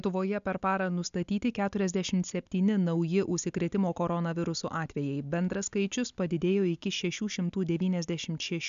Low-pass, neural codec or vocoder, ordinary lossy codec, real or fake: 7.2 kHz; none; AAC, 96 kbps; real